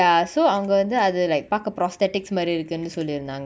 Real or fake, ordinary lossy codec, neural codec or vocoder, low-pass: real; none; none; none